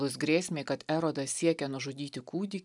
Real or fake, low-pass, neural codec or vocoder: real; 10.8 kHz; none